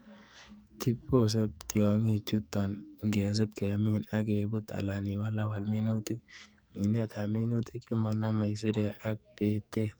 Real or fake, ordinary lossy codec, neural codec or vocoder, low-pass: fake; none; codec, 44.1 kHz, 2.6 kbps, SNAC; none